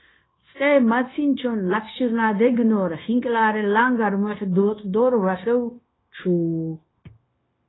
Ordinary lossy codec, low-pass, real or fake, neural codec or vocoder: AAC, 16 kbps; 7.2 kHz; fake; codec, 16 kHz, 0.9 kbps, LongCat-Audio-Codec